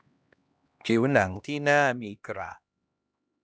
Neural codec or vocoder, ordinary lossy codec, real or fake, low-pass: codec, 16 kHz, 1 kbps, X-Codec, HuBERT features, trained on LibriSpeech; none; fake; none